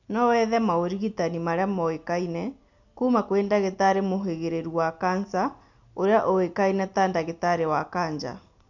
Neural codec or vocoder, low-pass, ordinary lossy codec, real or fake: none; 7.2 kHz; none; real